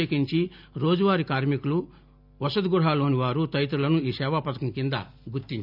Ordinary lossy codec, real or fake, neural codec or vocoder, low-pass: none; real; none; 5.4 kHz